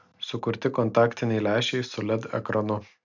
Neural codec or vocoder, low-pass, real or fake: none; 7.2 kHz; real